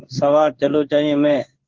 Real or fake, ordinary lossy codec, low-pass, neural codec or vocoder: fake; Opus, 16 kbps; 7.2 kHz; codec, 16 kHz in and 24 kHz out, 1 kbps, XY-Tokenizer